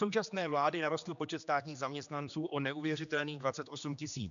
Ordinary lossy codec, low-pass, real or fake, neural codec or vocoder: MP3, 96 kbps; 7.2 kHz; fake; codec, 16 kHz, 2 kbps, X-Codec, HuBERT features, trained on general audio